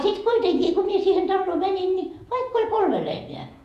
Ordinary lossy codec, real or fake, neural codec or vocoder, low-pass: none; fake; vocoder, 48 kHz, 128 mel bands, Vocos; 14.4 kHz